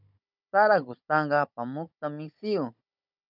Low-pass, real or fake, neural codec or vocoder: 5.4 kHz; fake; codec, 16 kHz, 16 kbps, FunCodec, trained on Chinese and English, 50 frames a second